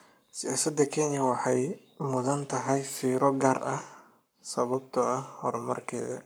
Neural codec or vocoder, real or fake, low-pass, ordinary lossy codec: codec, 44.1 kHz, 7.8 kbps, Pupu-Codec; fake; none; none